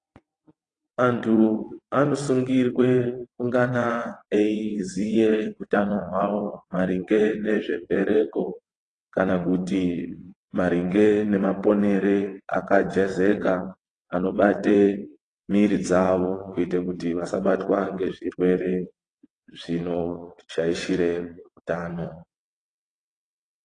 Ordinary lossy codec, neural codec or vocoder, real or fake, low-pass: AAC, 32 kbps; vocoder, 22.05 kHz, 80 mel bands, WaveNeXt; fake; 9.9 kHz